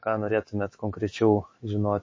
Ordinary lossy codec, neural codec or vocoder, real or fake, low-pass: MP3, 32 kbps; autoencoder, 48 kHz, 128 numbers a frame, DAC-VAE, trained on Japanese speech; fake; 7.2 kHz